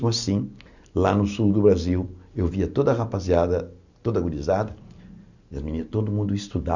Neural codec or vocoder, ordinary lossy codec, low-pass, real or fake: none; none; 7.2 kHz; real